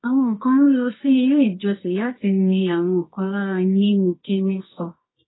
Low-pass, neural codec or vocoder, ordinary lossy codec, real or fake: 7.2 kHz; codec, 24 kHz, 0.9 kbps, WavTokenizer, medium music audio release; AAC, 16 kbps; fake